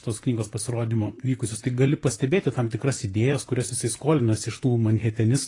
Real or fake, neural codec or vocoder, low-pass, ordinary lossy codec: fake; vocoder, 44.1 kHz, 128 mel bands, Pupu-Vocoder; 10.8 kHz; AAC, 32 kbps